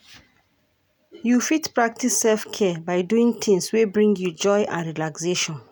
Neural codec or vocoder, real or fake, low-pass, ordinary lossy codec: none; real; none; none